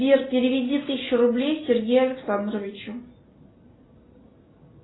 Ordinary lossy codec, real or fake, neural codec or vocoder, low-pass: AAC, 16 kbps; real; none; 7.2 kHz